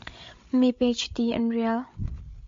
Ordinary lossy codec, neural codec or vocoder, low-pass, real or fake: MP3, 48 kbps; codec, 16 kHz, 8 kbps, FreqCodec, larger model; 7.2 kHz; fake